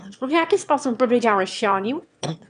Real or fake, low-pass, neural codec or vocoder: fake; 9.9 kHz; autoencoder, 22.05 kHz, a latent of 192 numbers a frame, VITS, trained on one speaker